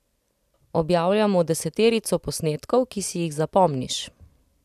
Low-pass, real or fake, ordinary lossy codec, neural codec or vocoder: 14.4 kHz; real; none; none